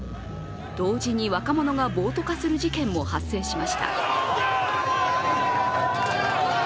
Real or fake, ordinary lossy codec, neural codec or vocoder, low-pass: real; none; none; none